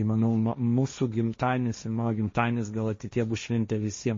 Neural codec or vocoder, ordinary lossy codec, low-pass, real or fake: codec, 16 kHz, 1.1 kbps, Voila-Tokenizer; MP3, 32 kbps; 7.2 kHz; fake